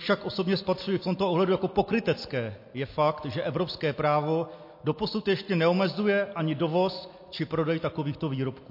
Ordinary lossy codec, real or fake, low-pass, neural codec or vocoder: MP3, 32 kbps; real; 5.4 kHz; none